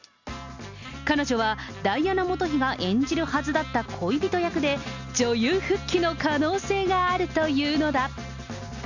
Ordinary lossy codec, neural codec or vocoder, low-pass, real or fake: none; none; 7.2 kHz; real